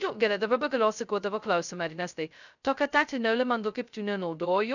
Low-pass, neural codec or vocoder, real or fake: 7.2 kHz; codec, 16 kHz, 0.2 kbps, FocalCodec; fake